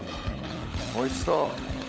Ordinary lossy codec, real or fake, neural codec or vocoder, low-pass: none; fake; codec, 16 kHz, 16 kbps, FunCodec, trained on LibriTTS, 50 frames a second; none